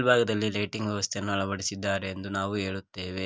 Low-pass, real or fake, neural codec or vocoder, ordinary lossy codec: none; real; none; none